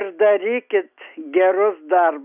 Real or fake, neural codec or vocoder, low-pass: real; none; 3.6 kHz